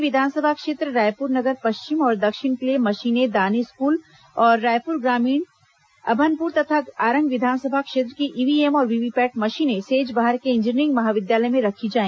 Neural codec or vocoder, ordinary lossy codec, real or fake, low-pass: none; none; real; none